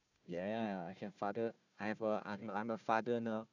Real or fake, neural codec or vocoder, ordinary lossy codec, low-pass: fake; codec, 16 kHz, 1 kbps, FunCodec, trained on Chinese and English, 50 frames a second; none; 7.2 kHz